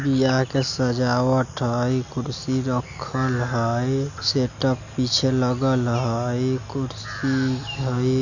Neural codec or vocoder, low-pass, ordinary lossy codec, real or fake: none; 7.2 kHz; none; real